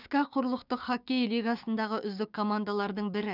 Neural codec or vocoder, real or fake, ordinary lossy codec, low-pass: codec, 16 kHz, 6 kbps, DAC; fake; none; 5.4 kHz